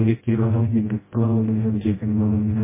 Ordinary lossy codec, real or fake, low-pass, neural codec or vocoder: MP3, 16 kbps; fake; 3.6 kHz; codec, 16 kHz, 0.5 kbps, FreqCodec, smaller model